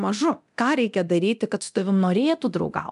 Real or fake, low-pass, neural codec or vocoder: fake; 10.8 kHz; codec, 24 kHz, 0.9 kbps, DualCodec